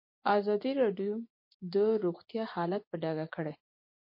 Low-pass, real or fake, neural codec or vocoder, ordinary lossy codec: 5.4 kHz; fake; vocoder, 24 kHz, 100 mel bands, Vocos; MP3, 32 kbps